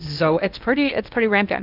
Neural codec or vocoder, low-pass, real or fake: codec, 16 kHz in and 24 kHz out, 0.8 kbps, FocalCodec, streaming, 65536 codes; 5.4 kHz; fake